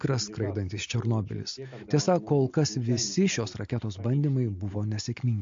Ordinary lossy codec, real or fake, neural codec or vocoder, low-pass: MP3, 48 kbps; real; none; 7.2 kHz